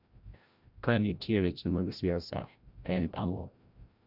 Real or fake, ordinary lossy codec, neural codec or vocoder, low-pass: fake; Opus, 64 kbps; codec, 16 kHz, 0.5 kbps, FreqCodec, larger model; 5.4 kHz